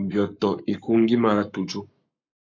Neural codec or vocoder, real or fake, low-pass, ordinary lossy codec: codec, 44.1 kHz, 7.8 kbps, Pupu-Codec; fake; 7.2 kHz; MP3, 64 kbps